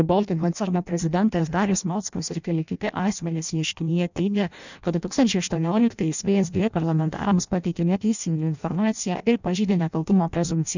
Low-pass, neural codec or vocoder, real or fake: 7.2 kHz; codec, 16 kHz in and 24 kHz out, 0.6 kbps, FireRedTTS-2 codec; fake